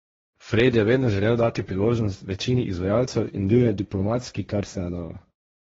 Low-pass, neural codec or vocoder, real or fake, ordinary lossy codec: 7.2 kHz; codec, 16 kHz, 1.1 kbps, Voila-Tokenizer; fake; AAC, 24 kbps